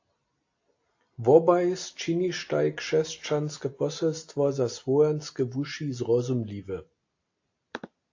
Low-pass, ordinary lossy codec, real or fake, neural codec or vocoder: 7.2 kHz; AAC, 48 kbps; real; none